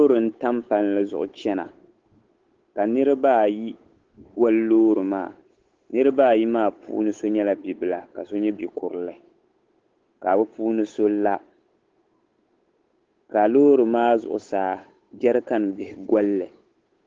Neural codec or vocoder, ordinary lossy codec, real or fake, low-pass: codec, 16 kHz, 8 kbps, FunCodec, trained on Chinese and English, 25 frames a second; Opus, 16 kbps; fake; 7.2 kHz